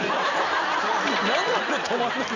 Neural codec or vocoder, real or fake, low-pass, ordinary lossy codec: vocoder, 44.1 kHz, 128 mel bands every 256 samples, BigVGAN v2; fake; 7.2 kHz; none